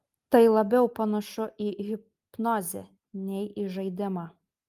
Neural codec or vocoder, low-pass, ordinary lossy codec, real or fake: none; 14.4 kHz; Opus, 32 kbps; real